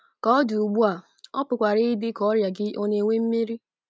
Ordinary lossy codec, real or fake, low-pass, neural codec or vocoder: none; real; none; none